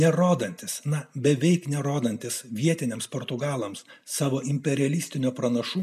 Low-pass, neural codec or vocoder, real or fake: 14.4 kHz; none; real